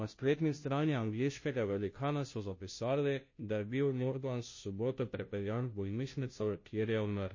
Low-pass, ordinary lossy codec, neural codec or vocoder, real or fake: 7.2 kHz; MP3, 32 kbps; codec, 16 kHz, 0.5 kbps, FunCodec, trained on Chinese and English, 25 frames a second; fake